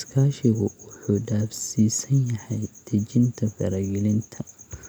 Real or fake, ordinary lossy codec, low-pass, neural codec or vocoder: real; none; none; none